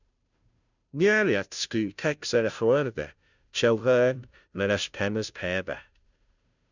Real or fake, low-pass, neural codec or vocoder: fake; 7.2 kHz; codec, 16 kHz, 0.5 kbps, FunCodec, trained on Chinese and English, 25 frames a second